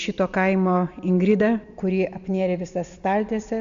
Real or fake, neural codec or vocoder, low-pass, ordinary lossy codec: real; none; 7.2 kHz; AAC, 96 kbps